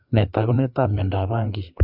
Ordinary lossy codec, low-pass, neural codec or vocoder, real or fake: none; 5.4 kHz; codec, 16 kHz, 4 kbps, FreqCodec, larger model; fake